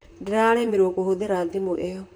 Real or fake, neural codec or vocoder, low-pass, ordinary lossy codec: fake; vocoder, 44.1 kHz, 128 mel bands, Pupu-Vocoder; none; none